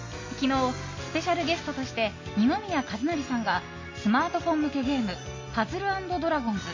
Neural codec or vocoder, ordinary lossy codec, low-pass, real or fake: none; MP3, 32 kbps; 7.2 kHz; real